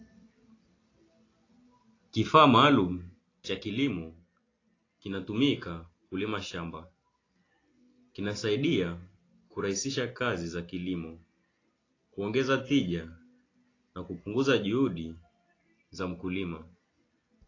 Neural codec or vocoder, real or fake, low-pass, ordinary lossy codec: none; real; 7.2 kHz; AAC, 32 kbps